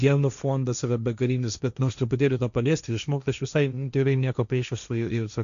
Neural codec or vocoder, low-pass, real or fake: codec, 16 kHz, 1.1 kbps, Voila-Tokenizer; 7.2 kHz; fake